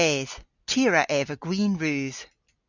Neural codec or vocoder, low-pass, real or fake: none; 7.2 kHz; real